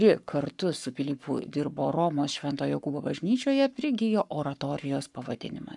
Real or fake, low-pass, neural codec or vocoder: fake; 10.8 kHz; codec, 44.1 kHz, 7.8 kbps, Pupu-Codec